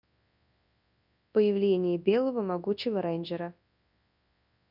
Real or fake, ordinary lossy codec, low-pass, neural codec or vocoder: fake; none; 5.4 kHz; codec, 24 kHz, 0.9 kbps, WavTokenizer, large speech release